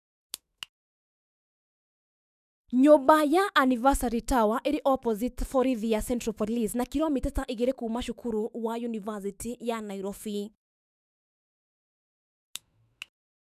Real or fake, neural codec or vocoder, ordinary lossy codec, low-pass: fake; autoencoder, 48 kHz, 128 numbers a frame, DAC-VAE, trained on Japanese speech; none; 14.4 kHz